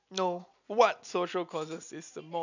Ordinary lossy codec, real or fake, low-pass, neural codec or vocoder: none; real; 7.2 kHz; none